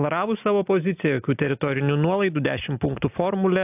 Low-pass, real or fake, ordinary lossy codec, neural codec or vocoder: 3.6 kHz; real; AAC, 32 kbps; none